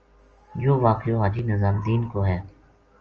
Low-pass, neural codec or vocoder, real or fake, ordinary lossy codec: 7.2 kHz; none; real; Opus, 24 kbps